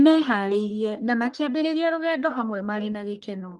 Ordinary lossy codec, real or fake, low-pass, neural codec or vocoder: Opus, 32 kbps; fake; 10.8 kHz; codec, 44.1 kHz, 1.7 kbps, Pupu-Codec